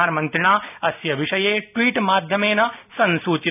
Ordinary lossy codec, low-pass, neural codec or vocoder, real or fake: none; 3.6 kHz; none; real